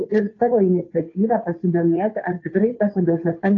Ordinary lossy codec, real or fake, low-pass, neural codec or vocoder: AAC, 32 kbps; fake; 7.2 kHz; codec, 16 kHz, 2 kbps, FunCodec, trained on Chinese and English, 25 frames a second